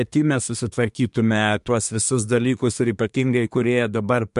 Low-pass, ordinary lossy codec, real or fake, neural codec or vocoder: 10.8 kHz; MP3, 64 kbps; fake; codec, 24 kHz, 1 kbps, SNAC